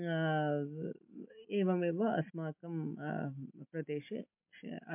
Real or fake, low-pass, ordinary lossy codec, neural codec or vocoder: real; 3.6 kHz; MP3, 32 kbps; none